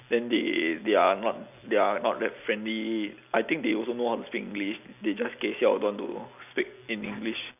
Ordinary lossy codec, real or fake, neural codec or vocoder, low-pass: none; real; none; 3.6 kHz